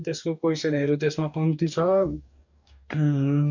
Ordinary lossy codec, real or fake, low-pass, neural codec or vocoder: none; fake; 7.2 kHz; codec, 44.1 kHz, 2.6 kbps, DAC